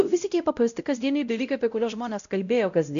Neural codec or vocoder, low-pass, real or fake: codec, 16 kHz, 0.5 kbps, X-Codec, WavLM features, trained on Multilingual LibriSpeech; 7.2 kHz; fake